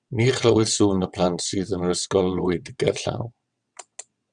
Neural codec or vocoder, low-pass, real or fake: vocoder, 22.05 kHz, 80 mel bands, WaveNeXt; 9.9 kHz; fake